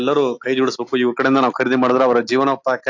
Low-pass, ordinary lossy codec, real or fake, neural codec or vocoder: 7.2 kHz; AAC, 48 kbps; real; none